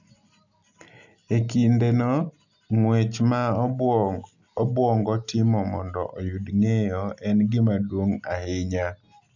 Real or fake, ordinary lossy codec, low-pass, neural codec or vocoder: real; none; 7.2 kHz; none